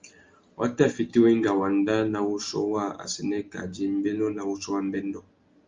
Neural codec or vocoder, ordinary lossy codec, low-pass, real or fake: none; Opus, 32 kbps; 7.2 kHz; real